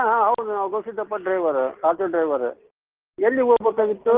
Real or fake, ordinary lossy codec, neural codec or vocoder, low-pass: real; Opus, 16 kbps; none; 3.6 kHz